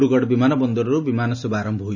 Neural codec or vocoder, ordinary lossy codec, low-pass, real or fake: none; none; 7.2 kHz; real